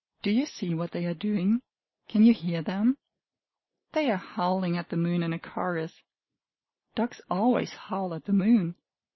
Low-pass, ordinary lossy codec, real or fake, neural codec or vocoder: 7.2 kHz; MP3, 24 kbps; real; none